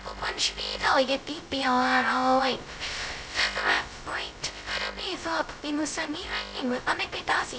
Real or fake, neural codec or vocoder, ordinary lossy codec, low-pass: fake; codec, 16 kHz, 0.2 kbps, FocalCodec; none; none